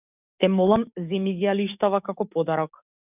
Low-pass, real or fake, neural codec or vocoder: 3.6 kHz; fake; codec, 44.1 kHz, 7.8 kbps, DAC